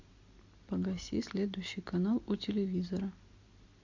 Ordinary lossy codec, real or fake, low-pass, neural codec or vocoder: AAC, 48 kbps; real; 7.2 kHz; none